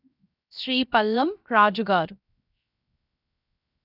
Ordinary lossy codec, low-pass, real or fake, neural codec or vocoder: none; 5.4 kHz; fake; codec, 16 kHz, 0.7 kbps, FocalCodec